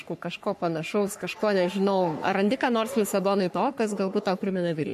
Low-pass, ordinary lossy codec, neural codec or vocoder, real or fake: 14.4 kHz; MP3, 64 kbps; codec, 44.1 kHz, 3.4 kbps, Pupu-Codec; fake